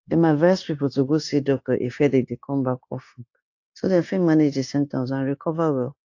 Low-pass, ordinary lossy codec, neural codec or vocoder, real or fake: 7.2 kHz; AAC, 48 kbps; codec, 16 kHz, 0.9 kbps, LongCat-Audio-Codec; fake